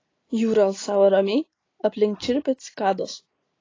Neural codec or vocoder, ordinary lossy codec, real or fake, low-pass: none; AAC, 32 kbps; real; 7.2 kHz